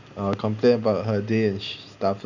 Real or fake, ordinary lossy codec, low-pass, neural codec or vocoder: real; none; 7.2 kHz; none